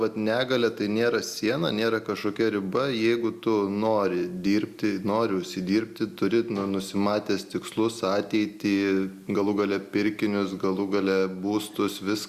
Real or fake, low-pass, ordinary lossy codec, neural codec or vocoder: real; 14.4 kHz; Opus, 64 kbps; none